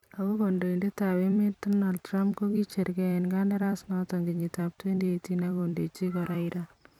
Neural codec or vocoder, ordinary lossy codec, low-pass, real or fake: vocoder, 44.1 kHz, 128 mel bands every 256 samples, BigVGAN v2; none; 19.8 kHz; fake